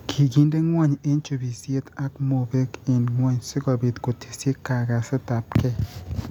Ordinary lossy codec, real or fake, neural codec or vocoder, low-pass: none; real; none; 19.8 kHz